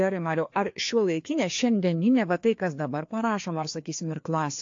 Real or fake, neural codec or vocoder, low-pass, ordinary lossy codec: fake; codec, 16 kHz, 2 kbps, FreqCodec, larger model; 7.2 kHz; AAC, 48 kbps